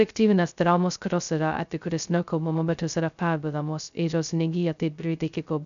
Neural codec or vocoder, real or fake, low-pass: codec, 16 kHz, 0.2 kbps, FocalCodec; fake; 7.2 kHz